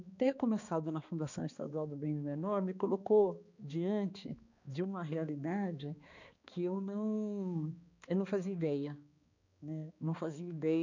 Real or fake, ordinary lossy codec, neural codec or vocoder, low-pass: fake; none; codec, 16 kHz, 2 kbps, X-Codec, HuBERT features, trained on balanced general audio; 7.2 kHz